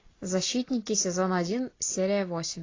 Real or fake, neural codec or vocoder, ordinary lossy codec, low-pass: real; none; AAC, 32 kbps; 7.2 kHz